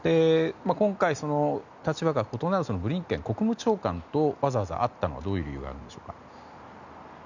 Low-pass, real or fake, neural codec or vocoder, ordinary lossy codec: 7.2 kHz; real; none; none